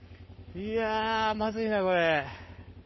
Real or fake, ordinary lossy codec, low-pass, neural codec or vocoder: fake; MP3, 24 kbps; 7.2 kHz; codec, 44.1 kHz, 7.8 kbps, Pupu-Codec